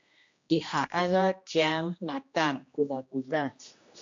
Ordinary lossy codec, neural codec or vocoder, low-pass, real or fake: MP3, 64 kbps; codec, 16 kHz, 1 kbps, X-Codec, HuBERT features, trained on general audio; 7.2 kHz; fake